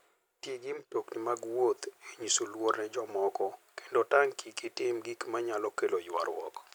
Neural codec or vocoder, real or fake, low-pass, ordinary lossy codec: none; real; none; none